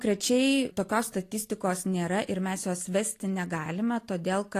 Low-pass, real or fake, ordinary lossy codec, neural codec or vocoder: 14.4 kHz; real; AAC, 48 kbps; none